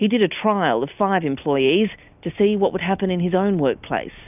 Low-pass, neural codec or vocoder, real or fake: 3.6 kHz; none; real